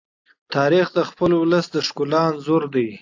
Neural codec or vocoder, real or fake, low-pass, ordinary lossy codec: none; real; 7.2 kHz; AAC, 48 kbps